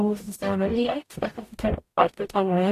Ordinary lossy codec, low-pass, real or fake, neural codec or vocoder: AAC, 64 kbps; 14.4 kHz; fake; codec, 44.1 kHz, 0.9 kbps, DAC